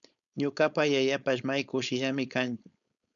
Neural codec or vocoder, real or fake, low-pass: codec, 16 kHz, 4.8 kbps, FACodec; fake; 7.2 kHz